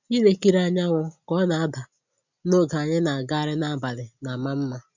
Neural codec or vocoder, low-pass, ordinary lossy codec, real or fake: none; 7.2 kHz; none; real